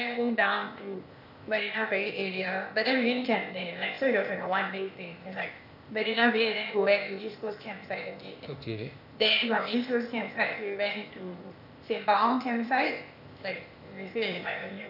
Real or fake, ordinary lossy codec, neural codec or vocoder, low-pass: fake; none; codec, 16 kHz, 0.8 kbps, ZipCodec; 5.4 kHz